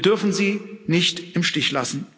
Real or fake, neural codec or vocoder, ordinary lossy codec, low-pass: real; none; none; none